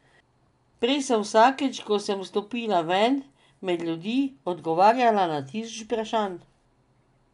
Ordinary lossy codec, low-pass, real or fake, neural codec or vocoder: none; 10.8 kHz; real; none